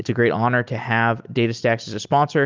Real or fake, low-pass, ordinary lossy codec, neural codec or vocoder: real; 7.2 kHz; Opus, 24 kbps; none